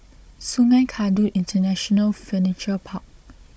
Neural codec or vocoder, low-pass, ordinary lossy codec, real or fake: codec, 16 kHz, 16 kbps, FunCodec, trained on Chinese and English, 50 frames a second; none; none; fake